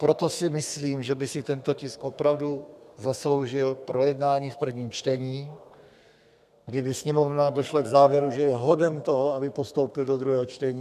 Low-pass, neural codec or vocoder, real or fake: 14.4 kHz; codec, 32 kHz, 1.9 kbps, SNAC; fake